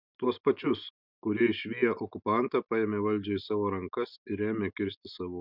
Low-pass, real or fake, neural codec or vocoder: 5.4 kHz; real; none